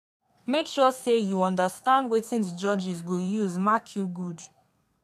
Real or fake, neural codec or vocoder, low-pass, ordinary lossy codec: fake; codec, 32 kHz, 1.9 kbps, SNAC; 14.4 kHz; none